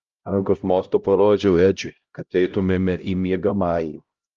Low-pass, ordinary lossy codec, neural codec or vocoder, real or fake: 7.2 kHz; Opus, 32 kbps; codec, 16 kHz, 0.5 kbps, X-Codec, HuBERT features, trained on LibriSpeech; fake